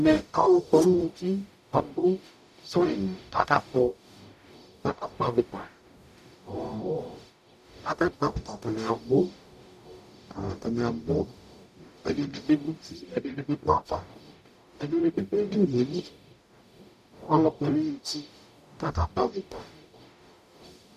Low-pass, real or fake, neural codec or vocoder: 14.4 kHz; fake; codec, 44.1 kHz, 0.9 kbps, DAC